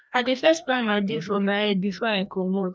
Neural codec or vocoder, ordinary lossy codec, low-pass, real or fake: codec, 16 kHz, 1 kbps, FreqCodec, larger model; none; none; fake